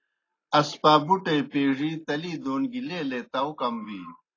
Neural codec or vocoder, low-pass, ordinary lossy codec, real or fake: none; 7.2 kHz; AAC, 32 kbps; real